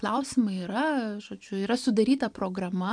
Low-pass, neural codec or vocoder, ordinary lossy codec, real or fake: 9.9 kHz; vocoder, 44.1 kHz, 128 mel bands every 512 samples, BigVGAN v2; MP3, 96 kbps; fake